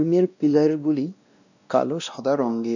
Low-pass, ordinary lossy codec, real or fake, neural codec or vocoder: 7.2 kHz; none; fake; codec, 16 kHz, 1 kbps, X-Codec, WavLM features, trained on Multilingual LibriSpeech